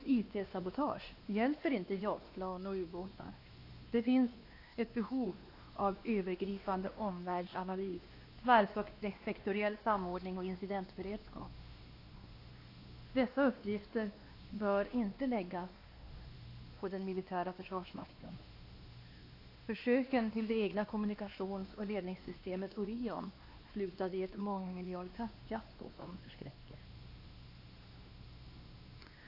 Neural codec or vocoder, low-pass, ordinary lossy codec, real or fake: codec, 16 kHz, 2 kbps, X-Codec, WavLM features, trained on Multilingual LibriSpeech; 5.4 kHz; AAC, 32 kbps; fake